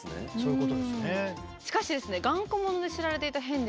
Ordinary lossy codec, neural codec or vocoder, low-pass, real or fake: none; none; none; real